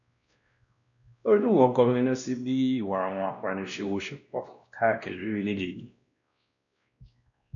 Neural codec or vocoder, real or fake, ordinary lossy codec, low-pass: codec, 16 kHz, 1 kbps, X-Codec, WavLM features, trained on Multilingual LibriSpeech; fake; none; 7.2 kHz